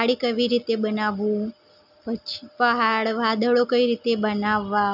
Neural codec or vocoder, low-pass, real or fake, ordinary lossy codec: none; 5.4 kHz; real; none